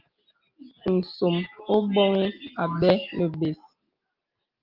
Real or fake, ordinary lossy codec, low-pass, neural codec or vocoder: real; Opus, 24 kbps; 5.4 kHz; none